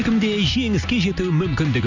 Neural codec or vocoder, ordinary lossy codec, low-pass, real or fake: none; none; 7.2 kHz; real